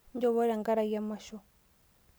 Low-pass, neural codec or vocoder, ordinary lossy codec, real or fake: none; none; none; real